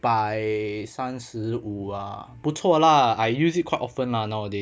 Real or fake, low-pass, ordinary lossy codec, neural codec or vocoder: real; none; none; none